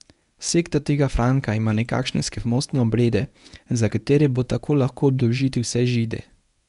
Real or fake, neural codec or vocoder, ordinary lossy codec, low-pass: fake; codec, 24 kHz, 0.9 kbps, WavTokenizer, medium speech release version 1; none; 10.8 kHz